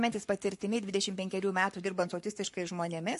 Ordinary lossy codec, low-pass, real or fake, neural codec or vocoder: MP3, 48 kbps; 14.4 kHz; fake; codec, 44.1 kHz, 7.8 kbps, Pupu-Codec